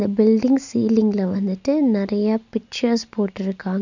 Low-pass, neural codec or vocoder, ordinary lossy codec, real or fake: 7.2 kHz; none; none; real